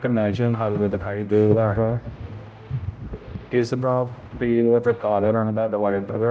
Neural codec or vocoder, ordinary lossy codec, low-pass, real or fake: codec, 16 kHz, 0.5 kbps, X-Codec, HuBERT features, trained on general audio; none; none; fake